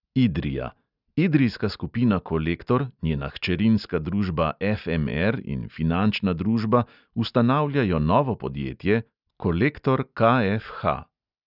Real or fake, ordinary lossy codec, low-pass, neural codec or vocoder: real; none; 5.4 kHz; none